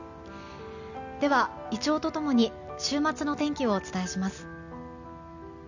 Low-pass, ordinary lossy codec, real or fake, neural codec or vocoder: 7.2 kHz; none; real; none